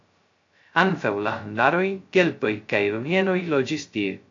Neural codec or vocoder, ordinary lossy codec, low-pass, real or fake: codec, 16 kHz, 0.2 kbps, FocalCodec; AAC, 48 kbps; 7.2 kHz; fake